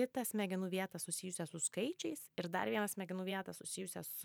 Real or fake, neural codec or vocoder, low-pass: fake; vocoder, 44.1 kHz, 128 mel bands every 512 samples, BigVGAN v2; 19.8 kHz